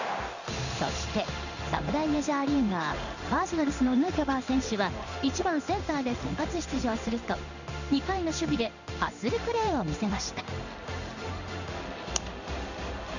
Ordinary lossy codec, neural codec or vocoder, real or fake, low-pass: none; codec, 16 kHz in and 24 kHz out, 1 kbps, XY-Tokenizer; fake; 7.2 kHz